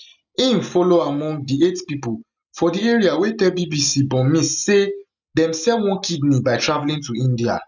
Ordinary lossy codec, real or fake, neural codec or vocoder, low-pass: none; real; none; 7.2 kHz